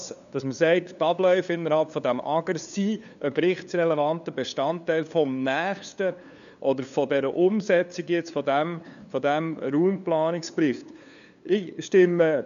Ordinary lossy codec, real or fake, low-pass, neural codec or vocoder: none; fake; 7.2 kHz; codec, 16 kHz, 2 kbps, FunCodec, trained on LibriTTS, 25 frames a second